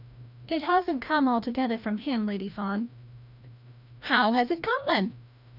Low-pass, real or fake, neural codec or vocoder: 5.4 kHz; fake; codec, 16 kHz, 1 kbps, FreqCodec, larger model